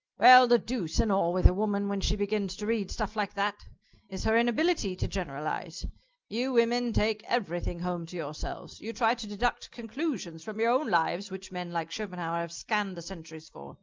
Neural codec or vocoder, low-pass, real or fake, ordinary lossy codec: none; 7.2 kHz; real; Opus, 24 kbps